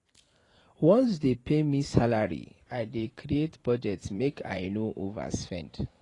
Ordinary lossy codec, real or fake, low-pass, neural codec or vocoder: AAC, 32 kbps; fake; 10.8 kHz; vocoder, 44.1 kHz, 128 mel bands every 512 samples, BigVGAN v2